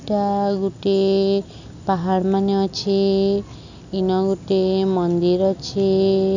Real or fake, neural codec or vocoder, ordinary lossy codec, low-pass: real; none; none; 7.2 kHz